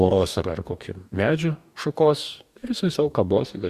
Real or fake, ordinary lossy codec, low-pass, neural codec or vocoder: fake; Opus, 64 kbps; 14.4 kHz; codec, 44.1 kHz, 2.6 kbps, DAC